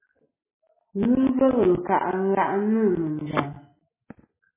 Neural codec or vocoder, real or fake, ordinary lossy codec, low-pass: none; real; MP3, 16 kbps; 3.6 kHz